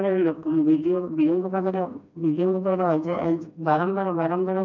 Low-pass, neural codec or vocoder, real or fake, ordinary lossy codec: 7.2 kHz; codec, 16 kHz, 1 kbps, FreqCodec, smaller model; fake; none